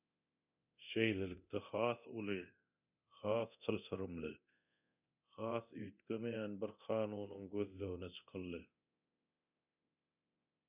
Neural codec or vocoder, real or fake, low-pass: codec, 24 kHz, 0.9 kbps, DualCodec; fake; 3.6 kHz